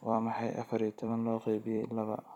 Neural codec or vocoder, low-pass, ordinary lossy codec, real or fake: vocoder, 44.1 kHz, 128 mel bands every 256 samples, BigVGAN v2; 19.8 kHz; none; fake